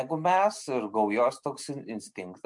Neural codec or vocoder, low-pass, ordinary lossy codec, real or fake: none; 10.8 kHz; MP3, 64 kbps; real